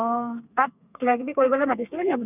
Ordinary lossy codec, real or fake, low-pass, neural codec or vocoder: none; fake; 3.6 kHz; codec, 32 kHz, 1.9 kbps, SNAC